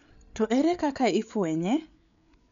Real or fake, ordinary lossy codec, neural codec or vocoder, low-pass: fake; none; codec, 16 kHz, 8 kbps, FreqCodec, larger model; 7.2 kHz